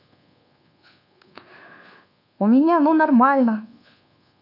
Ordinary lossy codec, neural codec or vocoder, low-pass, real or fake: none; codec, 24 kHz, 1.2 kbps, DualCodec; 5.4 kHz; fake